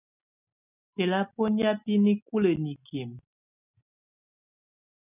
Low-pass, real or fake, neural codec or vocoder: 3.6 kHz; real; none